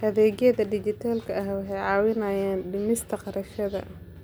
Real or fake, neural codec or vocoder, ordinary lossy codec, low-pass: real; none; none; none